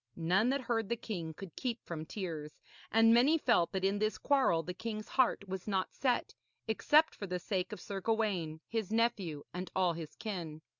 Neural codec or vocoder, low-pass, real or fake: none; 7.2 kHz; real